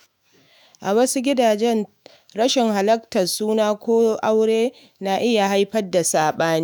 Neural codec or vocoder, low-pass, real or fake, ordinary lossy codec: autoencoder, 48 kHz, 128 numbers a frame, DAC-VAE, trained on Japanese speech; none; fake; none